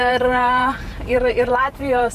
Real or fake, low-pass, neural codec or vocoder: fake; 14.4 kHz; vocoder, 44.1 kHz, 128 mel bands every 512 samples, BigVGAN v2